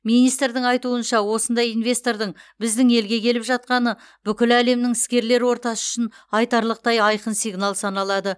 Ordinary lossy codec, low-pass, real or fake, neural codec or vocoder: none; none; real; none